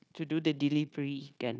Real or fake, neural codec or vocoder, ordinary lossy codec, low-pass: fake; codec, 16 kHz, 0.9 kbps, LongCat-Audio-Codec; none; none